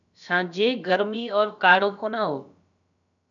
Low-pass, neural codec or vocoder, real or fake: 7.2 kHz; codec, 16 kHz, about 1 kbps, DyCAST, with the encoder's durations; fake